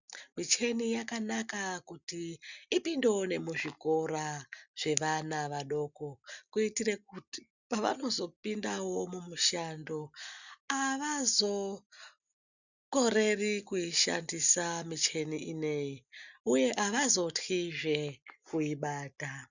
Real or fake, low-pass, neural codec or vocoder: real; 7.2 kHz; none